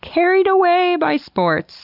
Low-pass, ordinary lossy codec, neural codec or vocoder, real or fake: 5.4 kHz; Opus, 64 kbps; none; real